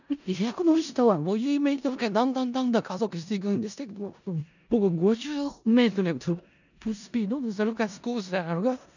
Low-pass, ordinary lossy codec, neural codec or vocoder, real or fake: 7.2 kHz; none; codec, 16 kHz in and 24 kHz out, 0.4 kbps, LongCat-Audio-Codec, four codebook decoder; fake